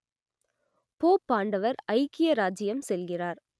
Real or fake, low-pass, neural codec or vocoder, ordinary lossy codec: real; none; none; none